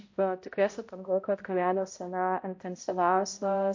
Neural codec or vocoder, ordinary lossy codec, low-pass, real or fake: codec, 16 kHz, 0.5 kbps, X-Codec, HuBERT features, trained on balanced general audio; MP3, 48 kbps; 7.2 kHz; fake